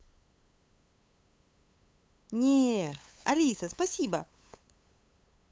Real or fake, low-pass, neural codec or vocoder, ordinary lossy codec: fake; none; codec, 16 kHz, 8 kbps, FunCodec, trained on LibriTTS, 25 frames a second; none